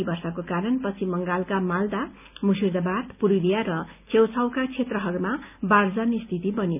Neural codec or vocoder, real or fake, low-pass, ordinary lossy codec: none; real; 3.6 kHz; none